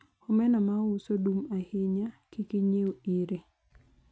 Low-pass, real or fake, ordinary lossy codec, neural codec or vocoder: none; real; none; none